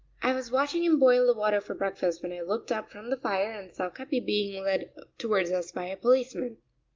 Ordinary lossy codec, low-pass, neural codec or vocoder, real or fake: Opus, 32 kbps; 7.2 kHz; none; real